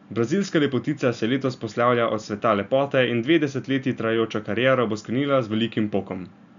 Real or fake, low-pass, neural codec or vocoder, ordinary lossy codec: real; 7.2 kHz; none; none